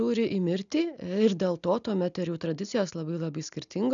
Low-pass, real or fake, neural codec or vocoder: 7.2 kHz; real; none